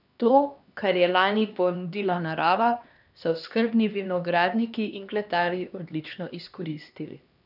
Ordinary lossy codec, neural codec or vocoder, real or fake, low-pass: none; codec, 16 kHz, 2 kbps, X-Codec, HuBERT features, trained on LibriSpeech; fake; 5.4 kHz